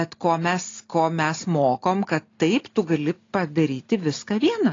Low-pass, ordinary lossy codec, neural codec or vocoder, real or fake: 7.2 kHz; AAC, 32 kbps; none; real